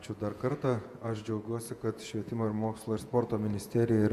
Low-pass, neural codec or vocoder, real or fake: 14.4 kHz; vocoder, 48 kHz, 128 mel bands, Vocos; fake